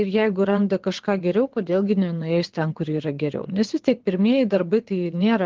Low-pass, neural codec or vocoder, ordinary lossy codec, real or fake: 7.2 kHz; vocoder, 22.05 kHz, 80 mel bands, WaveNeXt; Opus, 16 kbps; fake